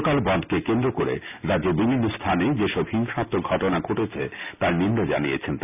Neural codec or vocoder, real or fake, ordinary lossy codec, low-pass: none; real; none; 3.6 kHz